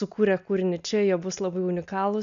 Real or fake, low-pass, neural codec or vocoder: real; 7.2 kHz; none